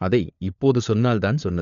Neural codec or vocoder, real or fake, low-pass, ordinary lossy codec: codec, 16 kHz, 4 kbps, FunCodec, trained on LibriTTS, 50 frames a second; fake; 7.2 kHz; none